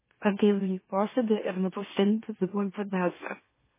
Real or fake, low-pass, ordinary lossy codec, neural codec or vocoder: fake; 3.6 kHz; MP3, 16 kbps; autoencoder, 44.1 kHz, a latent of 192 numbers a frame, MeloTTS